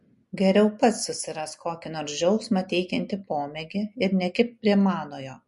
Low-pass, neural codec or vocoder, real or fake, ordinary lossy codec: 14.4 kHz; none; real; MP3, 48 kbps